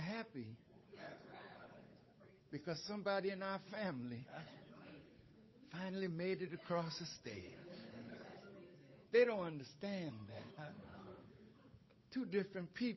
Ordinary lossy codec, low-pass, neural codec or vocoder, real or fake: MP3, 24 kbps; 7.2 kHz; codec, 16 kHz, 8 kbps, FunCodec, trained on Chinese and English, 25 frames a second; fake